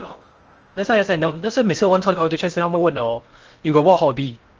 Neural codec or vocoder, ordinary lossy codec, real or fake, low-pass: codec, 16 kHz in and 24 kHz out, 0.6 kbps, FocalCodec, streaming, 4096 codes; Opus, 24 kbps; fake; 7.2 kHz